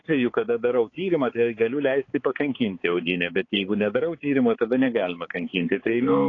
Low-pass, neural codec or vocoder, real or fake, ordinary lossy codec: 7.2 kHz; codec, 16 kHz, 4 kbps, X-Codec, HuBERT features, trained on balanced general audio; fake; AAC, 32 kbps